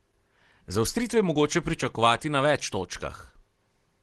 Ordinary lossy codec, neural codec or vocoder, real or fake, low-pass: Opus, 16 kbps; none; real; 10.8 kHz